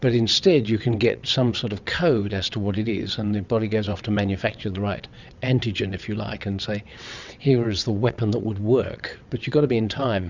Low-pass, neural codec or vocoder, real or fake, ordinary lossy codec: 7.2 kHz; vocoder, 22.05 kHz, 80 mel bands, WaveNeXt; fake; Opus, 64 kbps